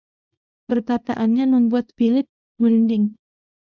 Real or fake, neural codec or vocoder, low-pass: fake; codec, 24 kHz, 0.9 kbps, WavTokenizer, small release; 7.2 kHz